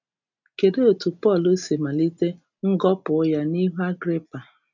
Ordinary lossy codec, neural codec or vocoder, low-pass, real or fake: none; none; 7.2 kHz; real